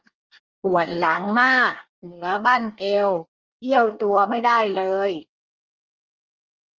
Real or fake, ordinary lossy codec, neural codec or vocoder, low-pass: fake; Opus, 24 kbps; codec, 24 kHz, 1 kbps, SNAC; 7.2 kHz